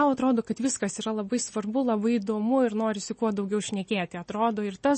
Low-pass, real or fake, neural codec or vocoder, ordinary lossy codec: 10.8 kHz; real; none; MP3, 32 kbps